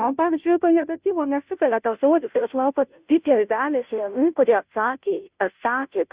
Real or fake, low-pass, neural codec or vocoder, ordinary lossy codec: fake; 3.6 kHz; codec, 16 kHz, 0.5 kbps, FunCodec, trained on Chinese and English, 25 frames a second; Opus, 64 kbps